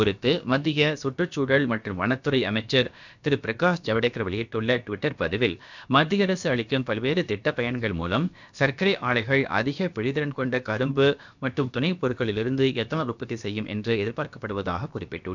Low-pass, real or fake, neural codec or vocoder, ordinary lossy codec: 7.2 kHz; fake; codec, 16 kHz, about 1 kbps, DyCAST, with the encoder's durations; none